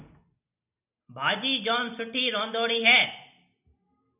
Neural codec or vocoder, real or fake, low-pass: none; real; 3.6 kHz